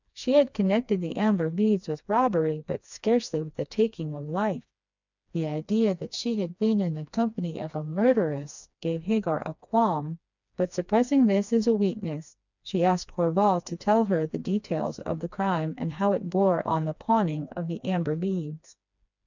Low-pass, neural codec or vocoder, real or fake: 7.2 kHz; codec, 16 kHz, 2 kbps, FreqCodec, smaller model; fake